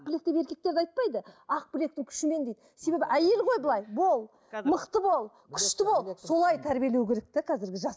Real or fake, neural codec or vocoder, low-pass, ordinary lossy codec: real; none; none; none